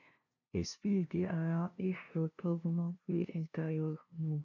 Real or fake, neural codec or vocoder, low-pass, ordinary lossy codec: fake; codec, 16 kHz, 0.5 kbps, FunCodec, trained on LibriTTS, 25 frames a second; 7.2 kHz; none